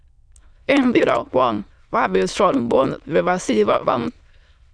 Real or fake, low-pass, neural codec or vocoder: fake; 9.9 kHz; autoencoder, 22.05 kHz, a latent of 192 numbers a frame, VITS, trained on many speakers